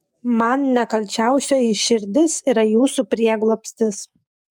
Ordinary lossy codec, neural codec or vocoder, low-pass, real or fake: MP3, 96 kbps; codec, 44.1 kHz, 7.8 kbps, DAC; 19.8 kHz; fake